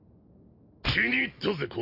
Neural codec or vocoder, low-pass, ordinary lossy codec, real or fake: none; 5.4 kHz; none; real